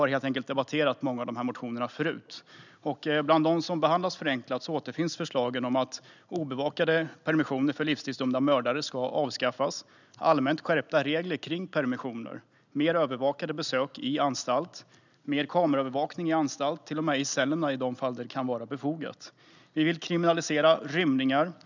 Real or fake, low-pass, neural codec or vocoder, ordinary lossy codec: real; 7.2 kHz; none; none